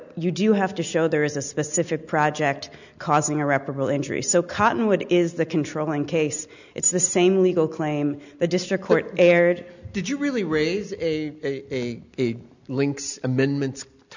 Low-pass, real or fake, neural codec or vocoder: 7.2 kHz; real; none